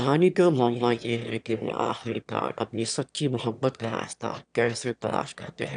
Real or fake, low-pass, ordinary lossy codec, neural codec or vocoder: fake; 9.9 kHz; none; autoencoder, 22.05 kHz, a latent of 192 numbers a frame, VITS, trained on one speaker